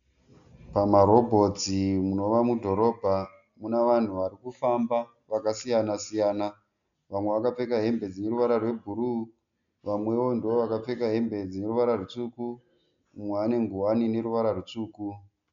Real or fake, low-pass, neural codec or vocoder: real; 7.2 kHz; none